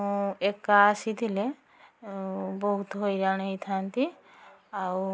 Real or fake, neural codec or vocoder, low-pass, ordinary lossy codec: real; none; none; none